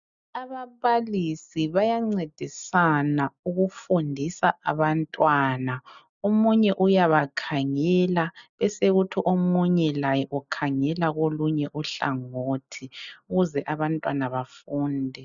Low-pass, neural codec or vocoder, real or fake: 7.2 kHz; none; real